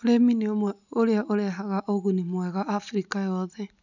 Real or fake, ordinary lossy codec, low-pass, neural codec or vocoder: real; none; 7.2 kHz; none